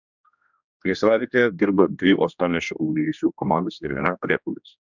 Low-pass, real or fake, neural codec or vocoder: 7.2 kHz; fake; codec, 16 kHz, 1 kbps, X-Codec, HuBERT features, trained on general audio